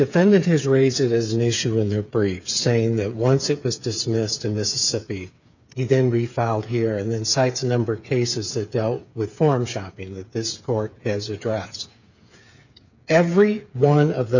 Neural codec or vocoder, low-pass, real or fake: codec, 16 kHz, 8 kbps, FreqCodec, smaller model; 7.2 kHz; fake